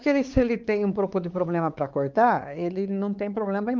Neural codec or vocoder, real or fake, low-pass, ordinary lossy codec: codec, 16 kHz, 4 kbps, X-Codec, WavLM features, trained on Multilingual LibriSpeech; fake; 7.2 kHz; Opus, 32 kbps